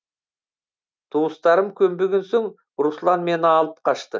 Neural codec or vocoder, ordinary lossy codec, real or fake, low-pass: none; none; real; none